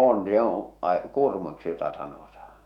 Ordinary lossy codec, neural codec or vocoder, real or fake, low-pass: none; autoencoder, 48 kHz, 128 numbers a frame, DAC-VAE, trained on Japanese speech; fake; 19.8 kHz